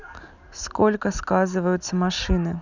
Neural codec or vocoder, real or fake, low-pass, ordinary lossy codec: none; real; 7.2 kHz; none